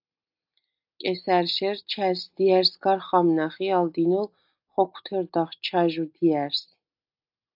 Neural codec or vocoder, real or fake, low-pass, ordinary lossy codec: none; real; 5.4 kHz; AAC, 48 kbps